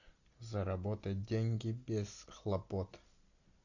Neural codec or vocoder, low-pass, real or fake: none; 7.2 kHz; real